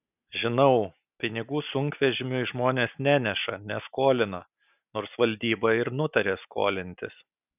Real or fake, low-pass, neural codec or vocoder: real; 3.6 kHz; none